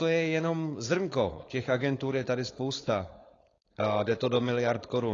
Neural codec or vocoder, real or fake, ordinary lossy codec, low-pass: codec, 16 kHz, 4.8 kbps, FACodec; fake; AAC, 32 kbps; 7.2 kHz